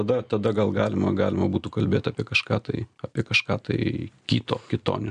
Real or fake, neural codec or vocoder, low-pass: real; none; 9.9 kHz